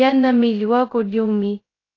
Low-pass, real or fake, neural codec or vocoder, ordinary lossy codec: 7.2 kHz; fake; codec, 16 kHz, 0.2 kbps, FocalCodec; AAC, 32 kbps